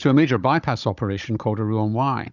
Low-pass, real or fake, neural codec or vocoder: 7.2 kHz; fake; codec, 16 kHz, 16 kbps, FunCodec, trained on Chinese and English, 50 frames a second